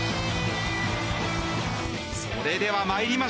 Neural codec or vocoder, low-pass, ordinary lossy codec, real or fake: none; none; none; real